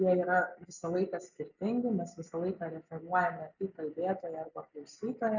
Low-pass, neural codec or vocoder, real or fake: 7.2 kHz; none; real